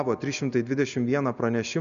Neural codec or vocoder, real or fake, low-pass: none; real; 7.2 kHz